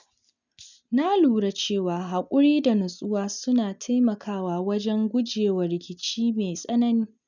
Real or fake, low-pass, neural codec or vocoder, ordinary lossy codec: real; 7.2 kHz; none; none